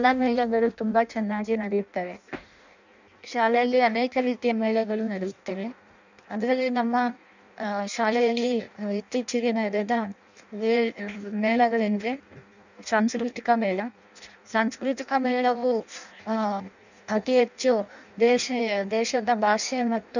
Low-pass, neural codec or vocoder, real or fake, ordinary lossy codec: 7.2 kHz; codec, 16 kHz in and 24 kHz out, 0.6 kbps, FireRedTTS-2 codec; fake; none